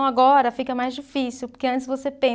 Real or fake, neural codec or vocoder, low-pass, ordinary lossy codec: real; none; none; none